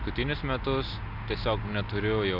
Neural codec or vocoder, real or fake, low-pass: none; real; 5.4 kHz